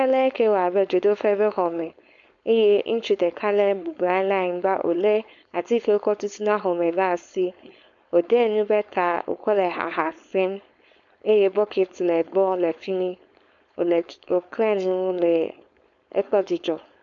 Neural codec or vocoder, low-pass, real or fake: codec, 16 kHz, 4.8 kbps, FACodec; 7.2 kHz; fake